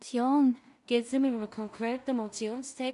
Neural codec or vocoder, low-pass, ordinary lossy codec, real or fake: codec, 16 kHz in and 24 kHz out, 0.4 kbps, LongCat-Audio-Codec, two codebook decoder; 10.8 kHz; none; fake